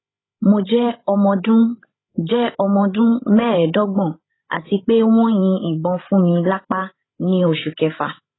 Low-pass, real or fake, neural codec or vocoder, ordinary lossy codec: 7.2 kHz; fake; codec, 16 kHz, 16 kbps, FreqCodec, larger model; AAC, 16 kbps